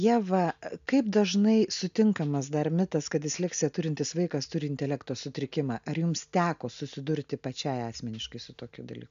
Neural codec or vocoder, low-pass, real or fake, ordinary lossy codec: none; 7.2 kHz; real; MP3, 64 kbps